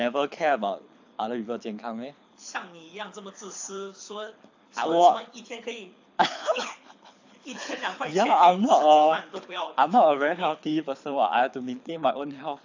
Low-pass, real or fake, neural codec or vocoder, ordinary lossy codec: 7.2 kHz; fake; codec, 24 kHz, 6 kbps, HILCodec; AAC, 48 kbps